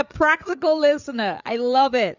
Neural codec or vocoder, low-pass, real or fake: codec, 16 kHz, 8 kbps, FreqCodec, larger model; 7.2 kHz; fake